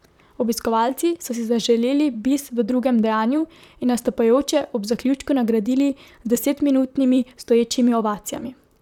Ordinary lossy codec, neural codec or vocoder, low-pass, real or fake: none; vocoder, 44.1 kHz, 128 mel bands, Pupu-Vocoder; 19.8 kHz; fake